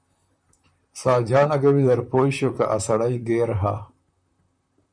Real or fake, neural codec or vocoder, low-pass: fake; vocoder, 44.1 kHz, 128 mel bands, Pupu-Vocoder; 9.9 kHz